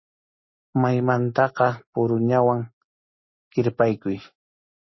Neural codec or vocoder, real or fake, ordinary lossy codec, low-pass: none; real; MP3, 24 kbps; 7.2 kHz